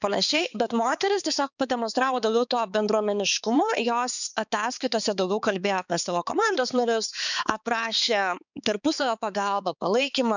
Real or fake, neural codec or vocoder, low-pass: fake; codec, 16 kHz, 4 kbps, X-Codec, HuBERT features, trained on balanced general audio; 7.2 kHz